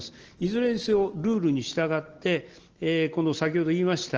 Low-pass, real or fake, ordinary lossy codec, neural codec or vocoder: 7.2 kHz; real; Opus, 16 kbps; none